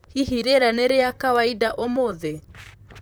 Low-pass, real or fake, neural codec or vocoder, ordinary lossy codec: none; fake; vocoder, 44.1 kHz, 128 mel bands, Pupu-Vocoder; none